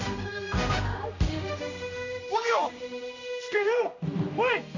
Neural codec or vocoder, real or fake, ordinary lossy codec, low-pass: codec, 16 kHz, 1 kbps, X-Codec, HuBERT features, trained on general audio; fake; MP3, 48 kbps; 7.2 kHz